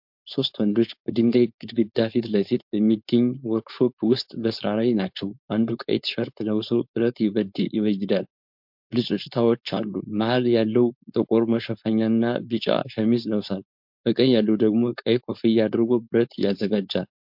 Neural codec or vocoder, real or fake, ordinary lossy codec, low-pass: codec, 16 kHz, 4.8 kbps, FACodec; fake; MP3, 48 kbps; 5.4 kHz